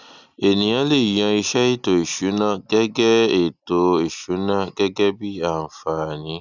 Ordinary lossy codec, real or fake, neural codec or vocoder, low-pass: none; real; none; 7.2 kHz